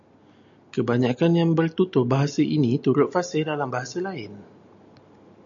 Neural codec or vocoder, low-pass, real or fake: none; 7.2 kHz; real